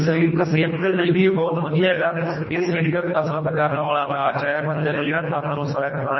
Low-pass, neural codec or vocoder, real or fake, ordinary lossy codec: 7.2 kHz; codec, 24 kHz, 1.5 kbps, HILCodec; fake; MP3, 24 kbps